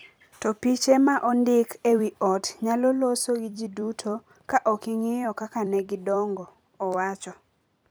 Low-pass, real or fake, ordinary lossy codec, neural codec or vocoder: none; fake; none; vocoder, 44.1 kHz, 128 mel bands every 256 samples, BigVGAN v2